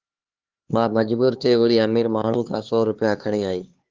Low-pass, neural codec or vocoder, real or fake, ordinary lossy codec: 7.2 kHz; codec, 16 kHz, 4 kbps, X-Codec, HuBERT features, trained on LibriSpeech; fake; Opus, 16 kbps